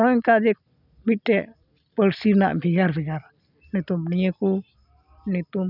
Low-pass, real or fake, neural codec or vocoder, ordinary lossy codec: 5.4 kHz; real; none; none